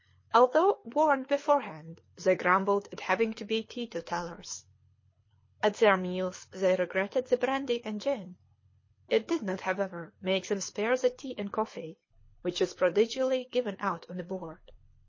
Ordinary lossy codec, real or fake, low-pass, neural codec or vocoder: MP3, 32 kbps; fake; 7.2 kHz; codec, 24 kHz, 6 kbps, HILCodec